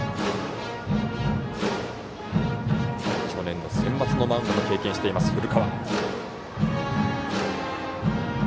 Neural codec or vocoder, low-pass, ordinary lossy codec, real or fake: none; none; none; real